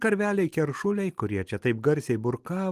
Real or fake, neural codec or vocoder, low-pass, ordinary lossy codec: real; none; 14.4 kHz; Opus, 24 kbps